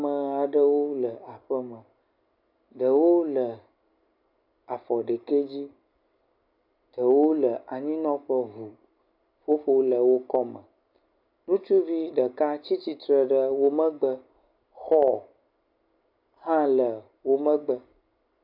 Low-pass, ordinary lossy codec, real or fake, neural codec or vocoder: 5.4 kHz; AAC, 48 kbps; real; none